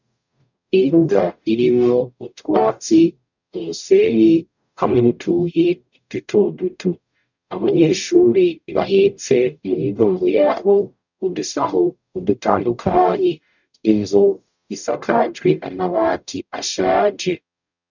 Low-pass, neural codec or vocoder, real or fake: 7.2 kHz; codec, 44.1 kHz, 0.9 kbps, DAC; fake